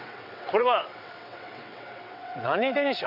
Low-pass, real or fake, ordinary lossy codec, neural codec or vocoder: 5.4 kHz; fake; none; vocoder, 22.05 kHz, 80 mel bands, WaveNeXt